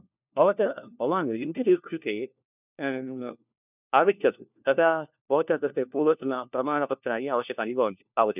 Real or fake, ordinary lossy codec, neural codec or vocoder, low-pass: fake; none; codec, 16 kHz, 1 kbps, FunCodec, trained on LibriTTS, 50 frames a second; 3.6 kHz